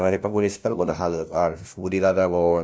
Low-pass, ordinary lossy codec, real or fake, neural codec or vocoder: none; none; fake; codec, 16 kHz, 0.5 kbps, FunCodec, trained on LibriTTS, 25 frames a second